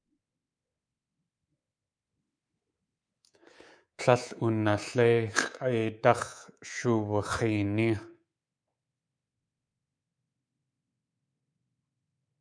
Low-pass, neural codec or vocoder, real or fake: 9.9 kHz; codec, 24 kHz, 3.1 kbps, DualCodec; fake